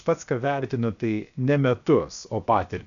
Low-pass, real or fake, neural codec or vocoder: 7.2 kHz; fake; codec, 16 kHz, about 1 kbps, DyCAST, with the encoder's durations